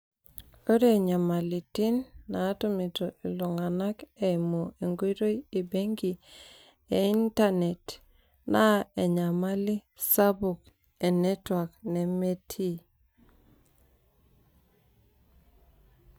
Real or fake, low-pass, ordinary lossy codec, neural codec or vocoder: real; none; none; none